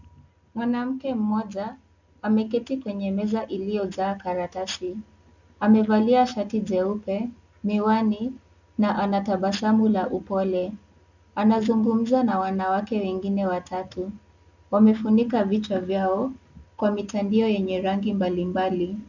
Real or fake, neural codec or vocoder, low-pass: real; none; 7.2 kHz